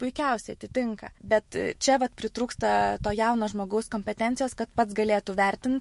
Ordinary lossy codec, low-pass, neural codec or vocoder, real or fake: MP3, 48 kbps; 14.4 kHz; autoencoder, 48 kHz, 128 numbers a frame, DAC-VAE, trained on Japanese speech; fake